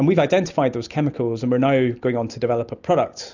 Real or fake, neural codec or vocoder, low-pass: real; none; 7.2 kHz